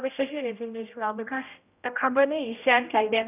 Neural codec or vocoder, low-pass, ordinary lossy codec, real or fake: codec, 16 kHz, 0.5 kbps, X-Codec, HuBERT features, trained on general audio; 3.6 kHz; none; fake